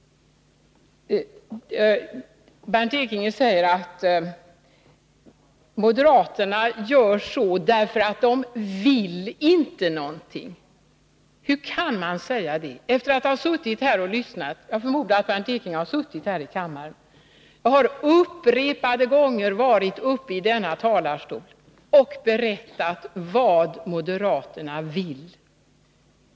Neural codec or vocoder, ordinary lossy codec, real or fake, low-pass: none; none; real; none